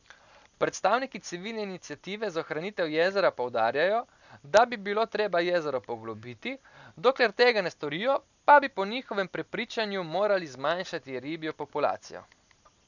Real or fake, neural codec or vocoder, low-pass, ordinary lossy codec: real; none; 7.2 kHz; none